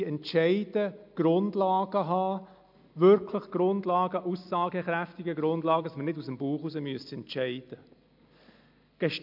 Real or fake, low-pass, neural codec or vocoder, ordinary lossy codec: real; 5.4 kHz; none; MP3, 48 kbps